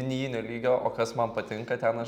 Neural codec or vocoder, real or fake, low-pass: none; real; 19.8 kHz